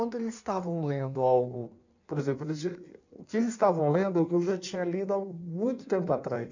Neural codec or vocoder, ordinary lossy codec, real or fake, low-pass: codec, 16 kHz in and 24 kHz out, 1.1 kbps, FireRedTTS-2 codec; none; fake; 7.2 kHz